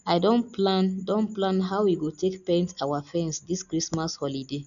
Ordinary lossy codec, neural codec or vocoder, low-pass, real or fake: none; none; 7.2 kHz; real